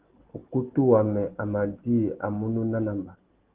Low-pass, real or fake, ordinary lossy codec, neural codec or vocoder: 3.6 kHz; real; Opus, 16 kbps; none